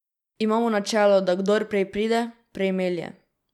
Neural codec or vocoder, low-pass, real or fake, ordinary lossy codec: none; 19.8 kHz; real; none